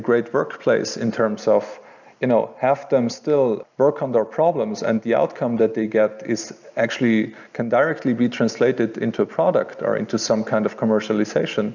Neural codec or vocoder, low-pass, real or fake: none; 7.2 kHz; real